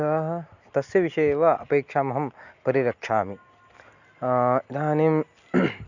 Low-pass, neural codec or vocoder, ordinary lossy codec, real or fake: 7.2 kHz; none; none; real